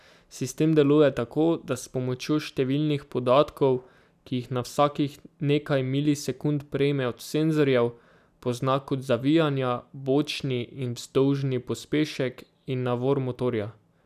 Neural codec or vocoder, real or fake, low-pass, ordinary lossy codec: none; real; 14.4 kHz; none